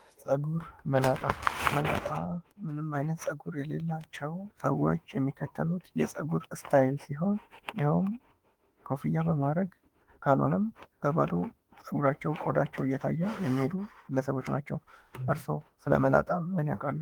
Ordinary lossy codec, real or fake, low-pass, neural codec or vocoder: Opus, 24 kbps; fake; 19.8 kHz; autoencoder, 48 kHz, 32 numbers a frame, DAC-VAE, trained on Japanese speech